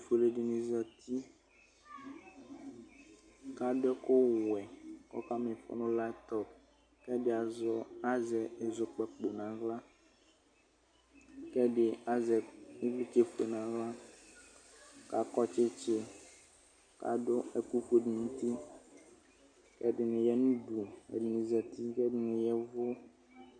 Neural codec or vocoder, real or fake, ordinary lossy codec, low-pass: none; real; MP3, 64 kbps; 9.9 kHz